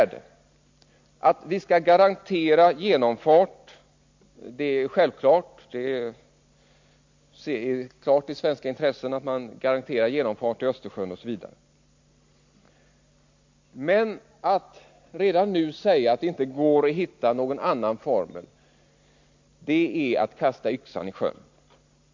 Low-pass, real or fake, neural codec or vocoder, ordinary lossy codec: 7.2 kHz; real; none; MP3, 48 kbps